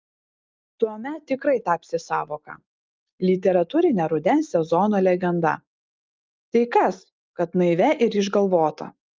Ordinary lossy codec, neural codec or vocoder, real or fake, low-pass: Opus, 24 kbps; none; real; 7.2 kHz